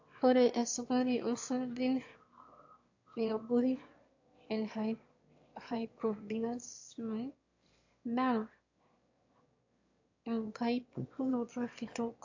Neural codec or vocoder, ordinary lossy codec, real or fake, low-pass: autoencoder, 22.05 kHz, a latent of 192 numbers a frame, VITS, trained on one speaker; AAC, 48 kbps; fake; 7.2 kHz